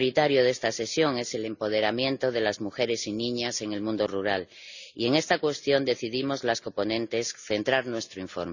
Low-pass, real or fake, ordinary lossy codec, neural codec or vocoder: 7.2 kHz; real; none; none